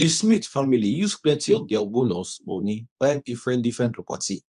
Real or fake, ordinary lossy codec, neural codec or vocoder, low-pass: fake; none; codec, 24 kHz, 0.9 kbps, WavTokenizer, medium speech release version 2; 10.8 kHz